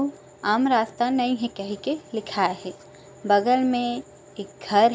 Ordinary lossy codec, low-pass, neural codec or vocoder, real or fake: none; none; none; real